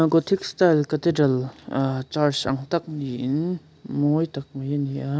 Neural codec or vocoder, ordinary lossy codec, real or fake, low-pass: none; none; real; none